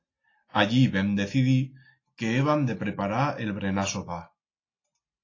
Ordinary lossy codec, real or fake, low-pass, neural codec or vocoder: AAC, 32 kbps; real; 7.2 kHz; none